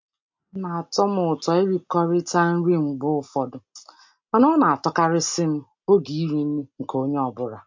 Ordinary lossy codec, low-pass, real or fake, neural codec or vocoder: MP3, 48 kbps; 7.2 kHz; real; none